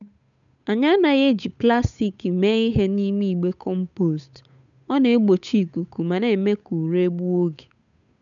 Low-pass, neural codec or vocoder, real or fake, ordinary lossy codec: 7.2 kHz; codec, 16 kHz, 8 kbps, FunCodec, trained on Chinese and English, 25 frames a second; fake; none